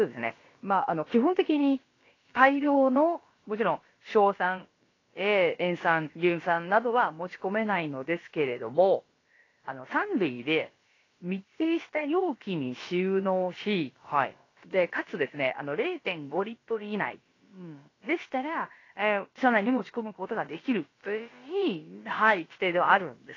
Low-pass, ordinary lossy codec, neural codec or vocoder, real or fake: 7.2 kHz; AAC, 32 kbps; codec, 16 kHz, about 1 kbps, DyCAST, with the encoder's durations; fake